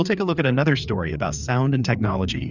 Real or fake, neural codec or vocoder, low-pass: fake; codec, 16 kHz, 4 kbps, FreqCodec, larger model; 7.2 kHz